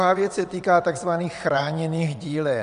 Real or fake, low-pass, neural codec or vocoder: fake; 9.9 kHz; vocoder, 22.05 kHz, 80 mel bands, WaveNeXt